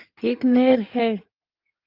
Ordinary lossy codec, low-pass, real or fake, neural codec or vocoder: Opus, 24 kbps; 5.4 kHz; fake; codec, 16 kHz in and 24 kHz out, 2.2 kbps, FireRedTTS-2 codec